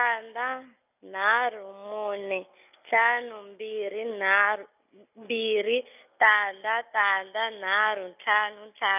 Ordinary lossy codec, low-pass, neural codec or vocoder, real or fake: none; 3.6 kHz; none; real